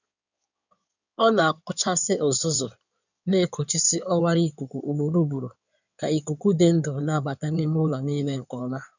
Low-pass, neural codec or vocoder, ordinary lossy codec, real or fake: 7.2 kHz; codec, 16 kHz in and 24 kHz out, 2.2 kbps, FireRedTTS-2 codec; none; fake